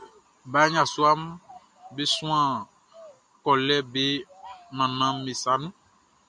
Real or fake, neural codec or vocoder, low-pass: real; none; 9.9 kHz